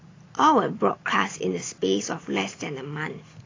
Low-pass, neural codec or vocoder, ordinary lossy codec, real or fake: 7.2 kHz; none; AAC, 32 kbps; real